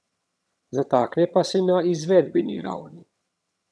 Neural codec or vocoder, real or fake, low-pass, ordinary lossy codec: vocoder, 22.05 kHz, 80 mel bands, HiFi-GAN; fake; none; none